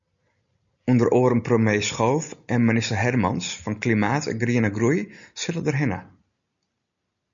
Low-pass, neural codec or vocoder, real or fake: 7.2 kHz; none; real